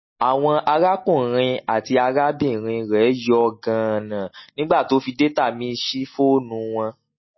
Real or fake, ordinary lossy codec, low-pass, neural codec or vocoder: real; MP3, 24 kbps; 7.2 kHz; none